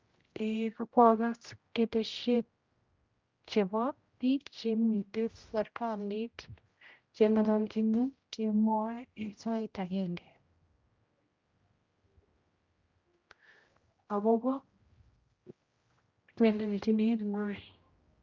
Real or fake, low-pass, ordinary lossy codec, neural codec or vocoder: fake; 7.2 kHz; Opus, 24 kbps; codec, 16 kHz, 0.5 kbps, X-Codec, HuBERT features, trained on general audio